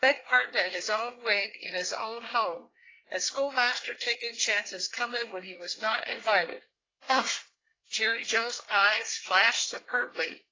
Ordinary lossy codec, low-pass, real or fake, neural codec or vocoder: AAC, 32 kbps; 7.2 kHz; fake; codec, 24 kHz, 1 kbps, SNAC